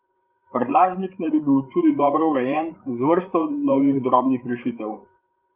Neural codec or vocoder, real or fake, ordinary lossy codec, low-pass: codec, 16 kHz, 8 kbps, FreqCodec, larger model; fake; Opus, 32 kbps; 3.6 kHz